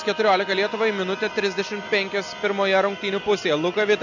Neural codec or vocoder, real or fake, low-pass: none; real; 7.2 kHz